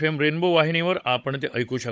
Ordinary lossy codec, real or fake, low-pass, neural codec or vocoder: none; fake; none; codec, 16 kHz, 16 kbps, FunCodec, trained on Chinese and English, 50 frames a second